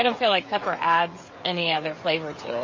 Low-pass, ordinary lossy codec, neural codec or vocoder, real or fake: 7.2 kHz; MP3, 32 kbps; codec, 16 kHz, 16 kbps, FreqCodec, smaller model; fake